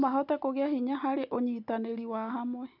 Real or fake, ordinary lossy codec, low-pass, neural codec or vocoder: real; none; 5.4 kHz; none